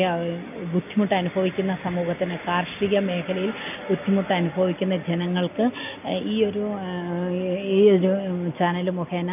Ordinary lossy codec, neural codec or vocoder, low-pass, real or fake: none; none; 3.6 kHz; real